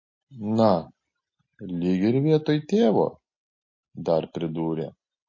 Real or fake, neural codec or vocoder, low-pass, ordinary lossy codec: real; none; 7.2 kHz; MP3, 32 kbps